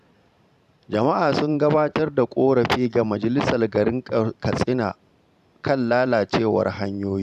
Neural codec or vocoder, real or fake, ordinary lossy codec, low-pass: vocoder, 44.1 kHz, 128 mel bands every 512 samples, BigVGAN v2; fake; none; 14.4 kHz